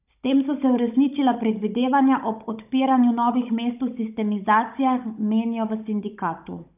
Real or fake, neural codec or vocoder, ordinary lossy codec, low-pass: fake; codec, 16 kHz, 16 kbps, FunCodec, trained on Chinese and English, 50 frames a second; none; 3.6 kHz